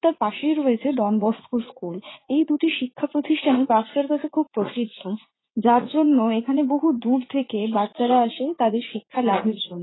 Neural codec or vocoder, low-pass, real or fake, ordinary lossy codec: vocoder, 44.1 kHz, 80 mel bands, Vocos; 7.2 kHz; fake; AAC, 16 kbps